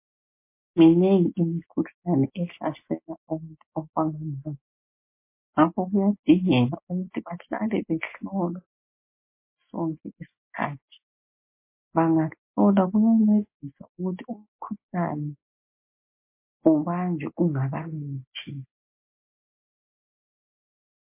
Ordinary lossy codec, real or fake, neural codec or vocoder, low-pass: MP3, 24 kbps; real; none; 3.6 kHz